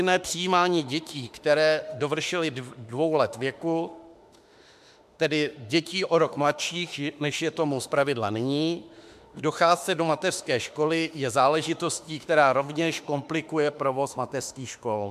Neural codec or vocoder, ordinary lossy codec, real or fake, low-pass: autoencoder, 48 kHz, 32 numbers a frame, DAC-VAE, trained on Japanese speech; MP3, 96 kbps; fake; 14.4 kHz